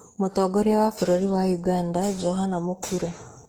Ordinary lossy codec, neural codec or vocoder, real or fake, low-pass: Opus, 16 kbps; autoencoder, 48 kHz, 32 numbers a frame, DAC-VAE, trained on Japanese speech; fake; 19.8 kHz